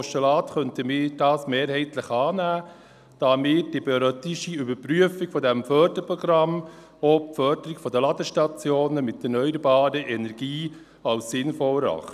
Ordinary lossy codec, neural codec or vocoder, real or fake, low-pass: none; none; real; 14.4 kHz